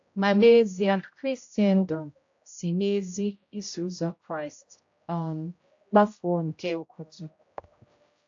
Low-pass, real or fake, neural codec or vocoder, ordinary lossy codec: 7.2 kHz; fake; codec, 16 kHz, 0.5 kbps, X-Codec, HuBERT features, trained on general audio; AAC, 48 kbps